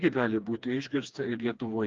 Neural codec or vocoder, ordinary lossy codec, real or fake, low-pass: codec, 16 kHz, 2 kbps, FreqCodec, smaller model; Opus, 32 kbps; fake; 7.2 kHz